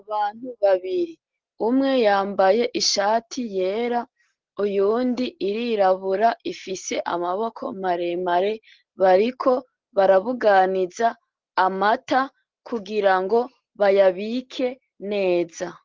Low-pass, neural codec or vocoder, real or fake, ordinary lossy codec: 7.2 kHz; none; real; Opus, 16 kbps